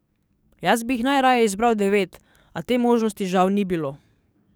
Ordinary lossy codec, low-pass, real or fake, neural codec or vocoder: none; none; fake; codec, 44.1 kHz, 7.8 kbps, DAC